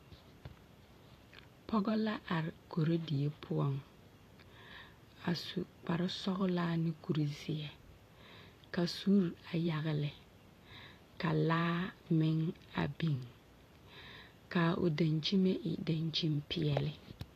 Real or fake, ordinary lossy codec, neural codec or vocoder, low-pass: fake; AAC, 48 kbps; vocoder, 44.1 kHz, 128 mel bands every 512 samples, BigVGAN v2; 14.4 kHz